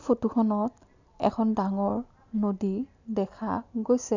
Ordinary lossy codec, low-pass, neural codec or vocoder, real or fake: none; 7.2 kHz; none; real